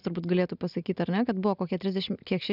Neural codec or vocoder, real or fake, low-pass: none; real; 5.4 kHz